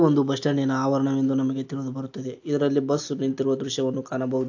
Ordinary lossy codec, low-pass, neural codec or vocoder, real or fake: AAC, 48 kbps; 7.2 kHz; none; real